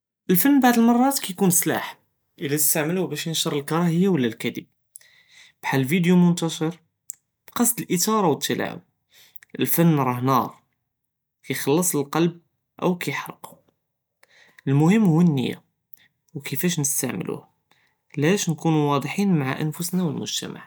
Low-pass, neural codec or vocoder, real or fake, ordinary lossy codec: none; none; real; none